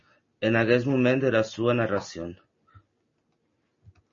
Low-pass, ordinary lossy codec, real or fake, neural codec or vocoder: 7.2 kHz; MP3, 32 kbps; real; none